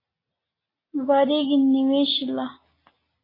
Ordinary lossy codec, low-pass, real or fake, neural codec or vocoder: AAC, 24 kbps; 5.4 kHz; real; none